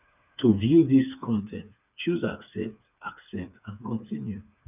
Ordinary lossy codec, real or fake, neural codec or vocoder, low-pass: none; fake; codec, 16 kHz, 4 kbps, FreqCodec, smaller model; 3.6 kHz